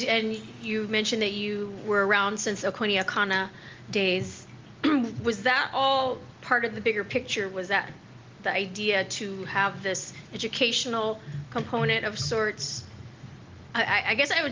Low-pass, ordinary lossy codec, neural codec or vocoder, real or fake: 7.2 kHz; Opus, 32 kbps; none; real